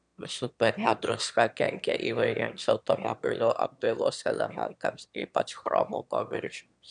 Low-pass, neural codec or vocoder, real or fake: 9.9 kHz; autoencoder, 22.05 kHz, a latent of 192 numbers a frame, VITS, trained on one speaker; fake